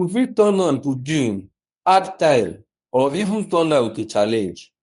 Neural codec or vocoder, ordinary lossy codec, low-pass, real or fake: codec, 24 kHz, 0.9 kbps, WavTokenizer, medium speech release version 1; MP3, 64 kbps; 10.8 kHz; fake